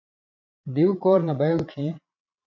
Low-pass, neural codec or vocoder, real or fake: 7.2 kHz; vocoder, 22.05 kHz, 80 mel bands, Vocos; fake